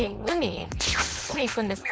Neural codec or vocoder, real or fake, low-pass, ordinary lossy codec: codec, 16 kHz, 4.8 kbps, FACodec; fake; none; none